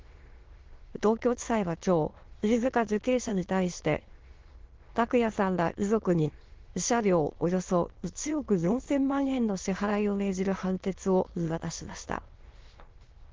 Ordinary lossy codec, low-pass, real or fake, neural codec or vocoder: Opus, 16 kbps; 7.2 kHz; fake; autoencoder, 22.05 kHz, a latent of 192 numbers a frame, VITS, trained on many speakers